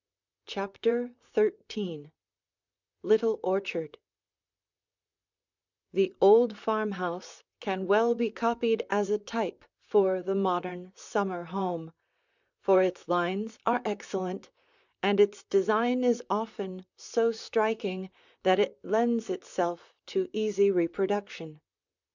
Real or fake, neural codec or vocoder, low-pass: fake; vocoder, 44.1 kHz, 128 mel bands, Pupu-Vocoder; 7.2 kHz